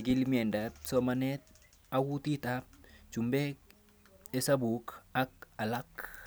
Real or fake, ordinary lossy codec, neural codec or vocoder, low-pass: real; none; none; none